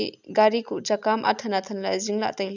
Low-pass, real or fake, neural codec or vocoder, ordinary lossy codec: 7.2 kHz; real; none; none